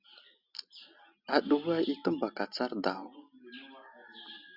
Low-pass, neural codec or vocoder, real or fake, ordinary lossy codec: 5.4 kHz; none; real; Opus, 64 kbps